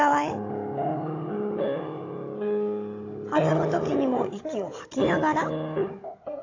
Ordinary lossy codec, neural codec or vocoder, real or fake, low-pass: AAC, 32 kbps; codec, 16 kHz, 16 kbps, FunCodec, trained on Chinese and English, 50 frames a second; fake; 7.2 kHz